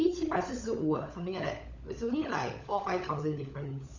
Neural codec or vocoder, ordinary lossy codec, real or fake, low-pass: codec, 16 kHz, 16 kbps, FunCodec, trained on LibriTTS, 50 frames a second; none; fake; 7.2 kHz